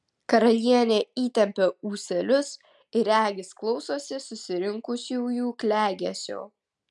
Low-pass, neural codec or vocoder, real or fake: 10.8 kHz; none; real